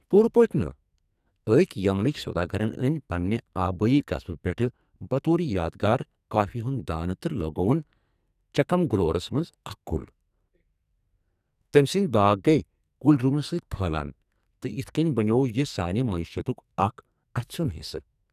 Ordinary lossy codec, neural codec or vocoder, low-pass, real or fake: none; codec, 44.1 kHz, 2.6 kbps, SNAC; 14.4 kHz; fake